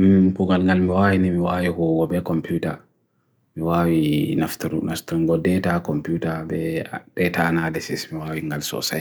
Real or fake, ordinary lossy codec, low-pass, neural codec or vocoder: real; none; none; none